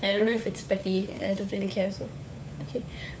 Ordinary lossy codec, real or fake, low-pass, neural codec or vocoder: none; fake; none; codec, 16 kHz, 4 kbps, FunCodec, trained on LibriTTS, 50 frames a second